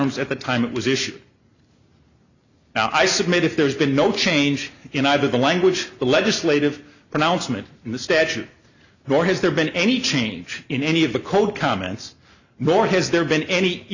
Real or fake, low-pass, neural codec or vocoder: real; 7.2 kHz; none